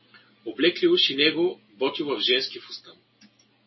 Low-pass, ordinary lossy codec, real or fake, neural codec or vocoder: 7.2 kHz; MP3, 24 kbps; real; none